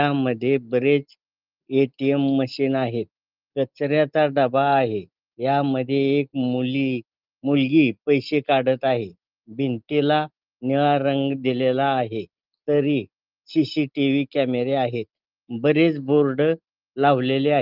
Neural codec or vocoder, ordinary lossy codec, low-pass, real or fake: none; Opus, 32 kbps; 5.4 kHz; real